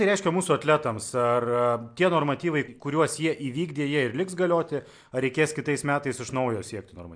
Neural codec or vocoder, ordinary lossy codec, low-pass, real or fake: none; MP3, 64 kbps; 9.9 kHz; real